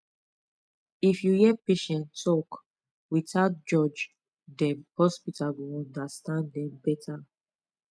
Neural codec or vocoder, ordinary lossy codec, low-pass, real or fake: none; none; none; real